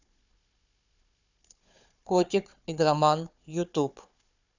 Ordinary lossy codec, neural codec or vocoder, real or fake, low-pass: none; codec, 16 kHz, 4 kbps, FunCodec, trained on Chinese and English, 50 frames a second; fake; 7.2 kHz